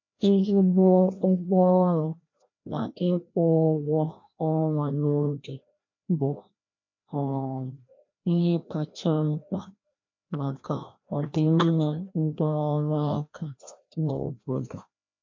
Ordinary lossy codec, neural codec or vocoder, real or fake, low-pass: MP3, 48 kbps; codec, 16 kHz, 1 kbps, FreqCodec, larger model; fake; 7.2 kHz